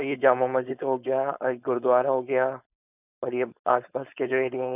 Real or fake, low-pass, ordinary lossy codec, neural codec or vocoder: fake; 3.6 kHz; none; codec, 16 kHz, 4.8 kbps, FACodec